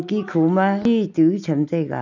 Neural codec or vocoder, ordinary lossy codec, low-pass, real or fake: none; none; 7.2 kHz; real